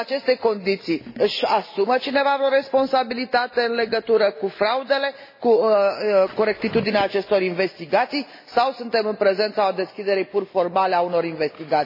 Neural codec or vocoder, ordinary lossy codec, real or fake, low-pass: none; MP3, 24 kbps; real; 5.4 kHz